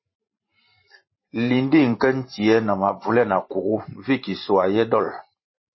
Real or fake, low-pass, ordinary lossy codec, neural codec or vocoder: fake; 7.2 kHz; MP3, 24 kbps; vocoder, 44.1 kHz, 128 mel bands every 512 samples, BigVGAN v2